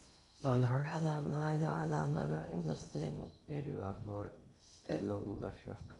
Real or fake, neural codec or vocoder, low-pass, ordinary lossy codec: fake; codec, 16 kHz in and 24 kHz out, 0.6 kbps, FocalCodec, streaming, 2048 codes; 10.8 kHz; none